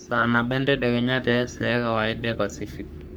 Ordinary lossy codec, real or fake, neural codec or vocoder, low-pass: none; fake; codec, 44.1 kHz, 3.4 kbps, Pupu-Codec; none